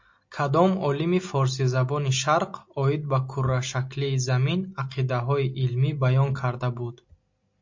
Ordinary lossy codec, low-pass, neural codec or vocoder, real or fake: MP3, 48 kbps; 7.2 kHz; none; real